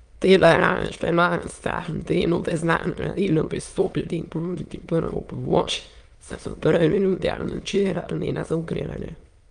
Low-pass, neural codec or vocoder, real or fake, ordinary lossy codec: 9.9 kHz; autoencoder, 22.05 kHz, a latent of 192 numbers a frame, VITS, trained on many speakers; fake; Opus, 32 kbps